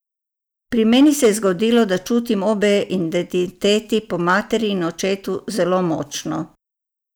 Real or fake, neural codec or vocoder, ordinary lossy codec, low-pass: real; none; none; none